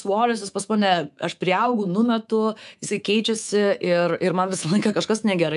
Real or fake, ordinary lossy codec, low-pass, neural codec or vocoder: fake; MP3, 96 kbps; 10.8 kHz; codec, 24 kHz, 3.1 kbps, DualCodec